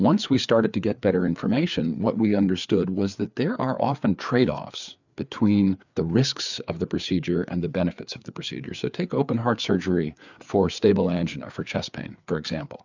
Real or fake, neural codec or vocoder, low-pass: fake; codec, 16 kHz, 4 kbps, FreqCodec, larger model; 7.2 kHz